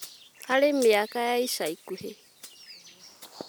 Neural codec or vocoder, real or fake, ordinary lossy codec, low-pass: none; real; none; none